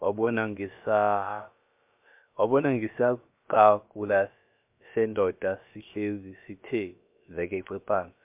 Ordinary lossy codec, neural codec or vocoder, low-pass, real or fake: MP3, 32 kbps; codec, 16 kHz, about 1 kbps, DyCAST, with the encoder's durations; 3.6 kHz; fake